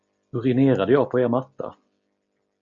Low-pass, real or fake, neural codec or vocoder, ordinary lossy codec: 7.2 kHz; real; none; MP3, 96 kbps